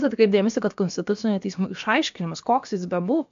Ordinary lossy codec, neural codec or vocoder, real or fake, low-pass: AAC, 64 kbps; codec, 16 kHz, about 1 kbps, DyCAST, with the encoder's durations; fake; 7.2 kHz